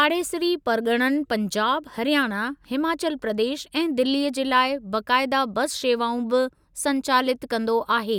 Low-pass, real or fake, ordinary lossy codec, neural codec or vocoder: 19.8 kHz; real; none; none